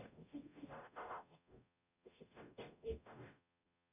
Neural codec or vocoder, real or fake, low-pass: codec, 44.1 kHz, 0.9 kbps, DAC; fake; 3.6 kHz